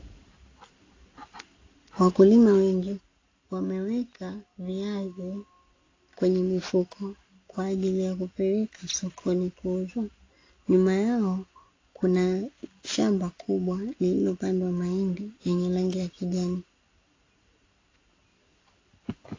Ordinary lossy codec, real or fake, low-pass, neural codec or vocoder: AAC, 32 kbps; real; 7.2 kHz; none